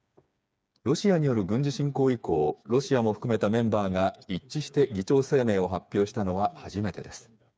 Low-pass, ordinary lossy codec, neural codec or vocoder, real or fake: none; none; codec, 16 kHz, 4 kbps, FreqCodec, smaller model; fake